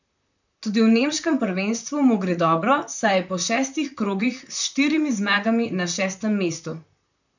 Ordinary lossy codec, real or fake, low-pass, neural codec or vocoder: none; fake; 7.2 kHz; vocoder, 44.1 kHz, 128 mel bands, Pupu-Vocoder